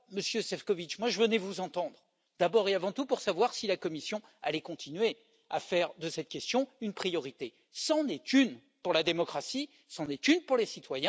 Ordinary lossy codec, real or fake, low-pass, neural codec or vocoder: none; real; none; none